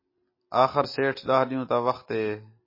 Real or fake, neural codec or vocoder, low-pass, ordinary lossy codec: real; none; 5.4 kHz; MP3, 24 kbps